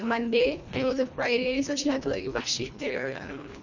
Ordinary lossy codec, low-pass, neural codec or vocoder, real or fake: none; 7.2 kHz; codec, 24 kHz, 1.5 kbps, HILCodec; fake